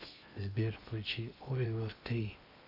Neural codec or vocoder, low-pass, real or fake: codec, 16 kHz in and 24 kHz out, 0.8 kbps, FocalCodec, streaming, 65536 codes; 5.4 kHz; fake